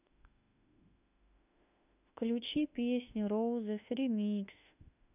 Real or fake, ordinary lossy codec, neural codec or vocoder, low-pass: fake; none; autoencoder, 48 kHz, 32 numbers a frame, DAC-VAE, trained on Japanese speech; 3.6 kHz